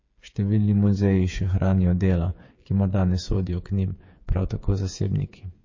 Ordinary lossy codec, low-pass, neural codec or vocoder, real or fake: MP3, 32 kbps; 7.2 kHz; codec, 16 kHz, 8 kbps, FreqCodec, smaller model; fake